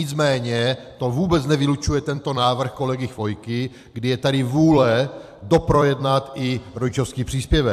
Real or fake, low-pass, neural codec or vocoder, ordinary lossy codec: fake; 14.4 kHz; vocoder, 44.1 kHz, 128 mel bands every 256 samples, BigVGAN v2; AAC, 96 kbps